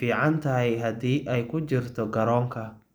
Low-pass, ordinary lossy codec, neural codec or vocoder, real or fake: none; none; none; real